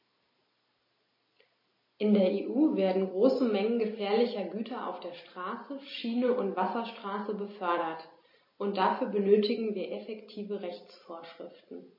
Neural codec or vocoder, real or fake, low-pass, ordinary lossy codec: none; real; 5.4 kHz; MP3, 24 kbps